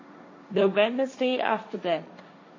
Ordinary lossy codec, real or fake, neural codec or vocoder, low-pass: MP3, 32 kbps; fake; codec, 16 kHz, 1.1 kbps, Voila-Tokenizer; 7.2 kHz